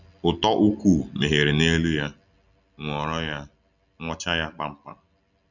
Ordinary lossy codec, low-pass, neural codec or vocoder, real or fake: none; 7.2 kHz; none; real